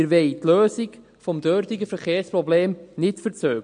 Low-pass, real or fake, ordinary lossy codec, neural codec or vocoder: 9.9 kHz; real; MP3, 48 kbps; none